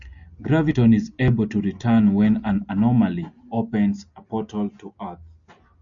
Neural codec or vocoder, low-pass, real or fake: none; 7.2 kHz; real